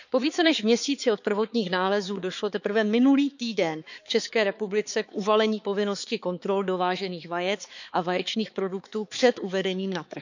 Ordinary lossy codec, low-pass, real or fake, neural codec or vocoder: none; 7.2 kHz; fake; codec, 16 kHz, 4 kbps, X-Codec, HuBERT features, trained on balanced general audio